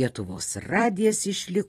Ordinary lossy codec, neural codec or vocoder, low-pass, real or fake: AAC, 32 kbps; vocoder, 44.1 kHz, 128 mel bands every 256 samples, BigVGAN v2; 19.8 kHz; fake